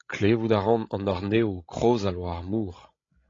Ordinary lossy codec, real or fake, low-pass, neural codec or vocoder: AAC, 32 kbps; fake; 7.2 kHz; codec, 16 kHz, 16 kbps, FreqCodec, larger model